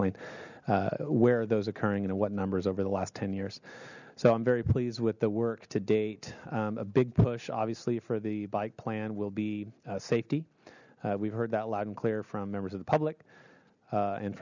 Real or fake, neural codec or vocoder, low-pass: real; none; 7.2 kHz